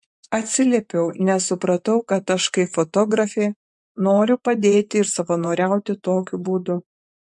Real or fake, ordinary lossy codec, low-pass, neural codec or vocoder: fake; MP3, 64 kbps; 10.8 kHz; vocoder, 24 kHz, 100 mel bands, Vocos